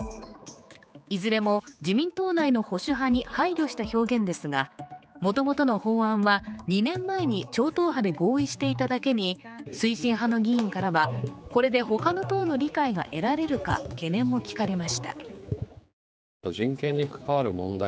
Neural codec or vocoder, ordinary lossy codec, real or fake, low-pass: codec, 16 kHz, 4 kbps, X-Codec, HuBERT features, trained on general audio; none; fake; none